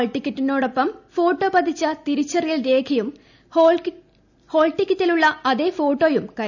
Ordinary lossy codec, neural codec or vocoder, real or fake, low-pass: none; none; real; 7.2 kHz